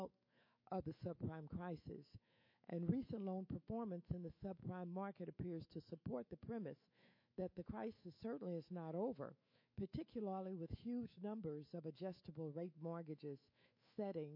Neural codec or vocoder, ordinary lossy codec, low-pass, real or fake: autoencoder, 48 kHz, 128 numbers a frame, DAC-VAE, trained on Japanese speech; MP3, 32 kbps; 5.4 kHz; fake